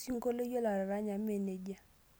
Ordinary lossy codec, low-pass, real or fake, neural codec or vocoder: none; none; real; none